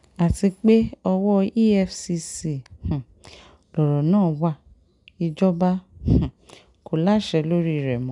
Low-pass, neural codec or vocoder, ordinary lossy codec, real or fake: 10.8 kHz; none; none; real